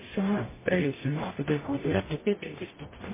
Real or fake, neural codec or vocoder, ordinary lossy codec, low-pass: fake; codec, 44.1 kHz, 0.9 kbps, DAC; MP3, 16 kbps; 3.6 kHz